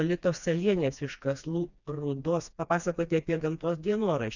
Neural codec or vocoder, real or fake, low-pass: codec, 16 kHz, 2 kbps, FreqCodec, smaller model; fake; 7.2 kHz